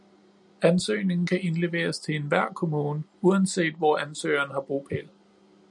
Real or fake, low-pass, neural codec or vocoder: real; 10.8 kHz; none